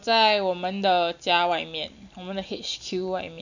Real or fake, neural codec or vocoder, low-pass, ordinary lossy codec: real; none; 7.2 kHz; none